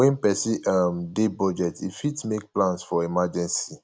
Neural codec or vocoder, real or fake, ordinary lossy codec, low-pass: none; real; none; none